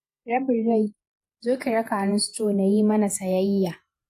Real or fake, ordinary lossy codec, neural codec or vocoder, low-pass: fake; AAC, 64 kbps; vocoder, 48 kHz, 128 mel bands, Vocos; 14.4 kHz